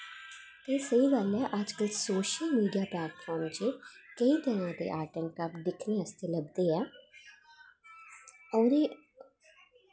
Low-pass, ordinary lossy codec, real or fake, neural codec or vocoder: none; none; real; none